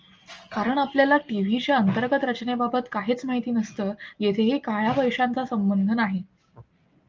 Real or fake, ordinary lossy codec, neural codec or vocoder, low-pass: real; Opus, 24 kbps; none; 7.2 kHz